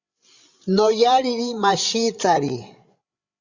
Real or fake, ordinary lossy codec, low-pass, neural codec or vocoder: fake; Opus, 64 kbps; 7.2 kHz; codec, 16 kHz, 8 kbps, FreqCodec, larger model